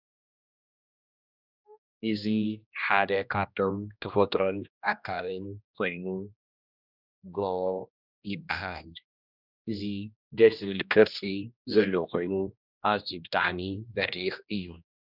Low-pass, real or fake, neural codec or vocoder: 5.4 kHz; fake; codec, 16 kHz, 1 kbps, X-Codec, HuBERT features, trained on general audio